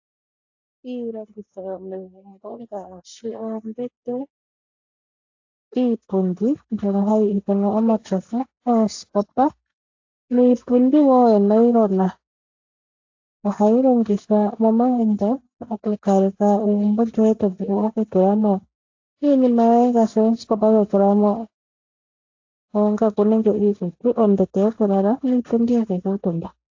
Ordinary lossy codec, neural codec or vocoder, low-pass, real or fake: AAC, 48 kbps; none; 7.2 kHz; real